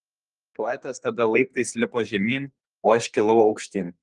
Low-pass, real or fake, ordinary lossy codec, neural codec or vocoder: 10.8 kHz; fake; Opus, 24 kbps; codec, 32 kHz, 1.9 kbps, SNAC